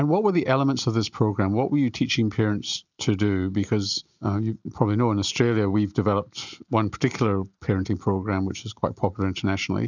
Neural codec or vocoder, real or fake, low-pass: none; real; 7.2 kHz